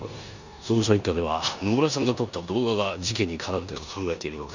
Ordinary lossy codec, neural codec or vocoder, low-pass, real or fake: none; codec, 16 kHz in and 24 kHz out, 0.9 kbps, LongCat-Audio-Codec, four codebook decoder; 7.2 kHz; fake